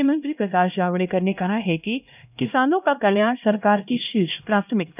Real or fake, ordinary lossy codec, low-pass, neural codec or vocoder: fake; none; 3.6 kHz; codec, 16 kHz, 1 kbps, X-Codec, HuBERT features, trained on LibriSpeech